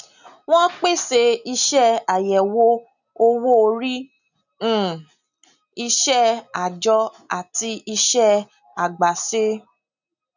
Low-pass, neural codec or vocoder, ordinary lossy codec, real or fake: 7.2 kHz; none; none; real